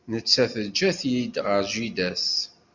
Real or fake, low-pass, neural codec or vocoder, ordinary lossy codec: real; 7.2 kHz; none; Opus, 64 kbps